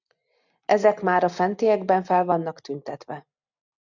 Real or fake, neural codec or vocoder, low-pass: real; none; 7.2 kHz